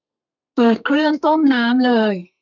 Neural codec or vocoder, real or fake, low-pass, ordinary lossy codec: codec, 32 kHz, 1.9 kbps, SNAC; fake; 7.2 kHz; none